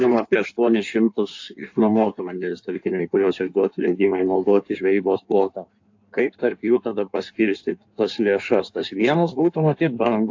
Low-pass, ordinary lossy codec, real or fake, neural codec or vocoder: 7.2 kHz; AAC, 48 kbps; fake; codec, 16 kHz in and 24 kHz out, 1.1 kbps, FireRedTTS-2 codec